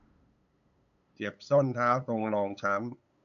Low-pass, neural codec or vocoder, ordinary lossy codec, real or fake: 7.2 kHz; codec, 16 kHz, 8 kbps, FunCodec, trained on LibriTTS, 25 frames a second; none; fake